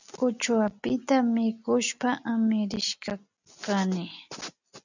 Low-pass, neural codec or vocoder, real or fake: 7.2 kHz; none; real